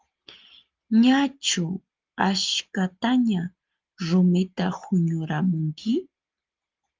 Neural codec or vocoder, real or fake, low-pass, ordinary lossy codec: none; real; 7.2 kHz; Opus, 24 kbps